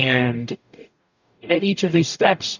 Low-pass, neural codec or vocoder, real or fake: 7.2 kHz; codec, 44.1 kHz, 0.9 kbps, DAC; fake